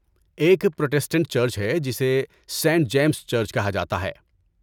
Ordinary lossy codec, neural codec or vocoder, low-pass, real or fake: none; none; 19.8 kHz; real